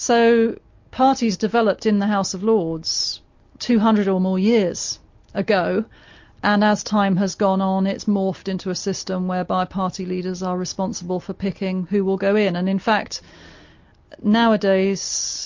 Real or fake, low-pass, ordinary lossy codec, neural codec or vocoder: real; 7.2 kHz; MP3, 48 kbps; none